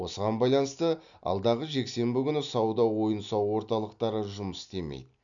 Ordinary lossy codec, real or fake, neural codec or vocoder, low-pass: none; real; none; 7.2 kHz